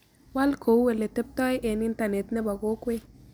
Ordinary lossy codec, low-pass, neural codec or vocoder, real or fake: none; none; none; real